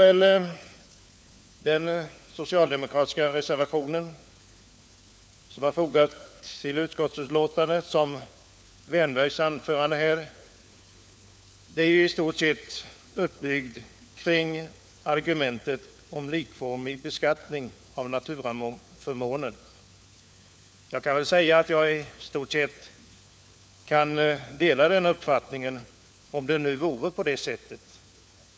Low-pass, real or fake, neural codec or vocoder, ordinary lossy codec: none; fake; codec, 16 kHz, 4 kbps, FunCodec, trained on LibriTTS, 50 frames a second; none